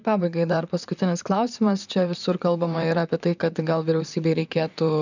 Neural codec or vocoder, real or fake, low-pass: vocoder, 44.1 kHz, 128 mel bands, Pupu-Vocoder; fake; 7.2 kHz